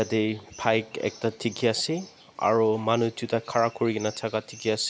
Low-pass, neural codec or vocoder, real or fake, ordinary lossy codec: none; none; real; none